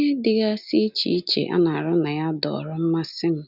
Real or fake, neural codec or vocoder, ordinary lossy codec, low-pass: real; none; none; 5.4 kHz